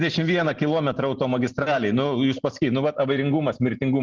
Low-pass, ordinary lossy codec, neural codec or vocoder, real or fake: 7.2 kHz; Opus, 16 kbps; none; real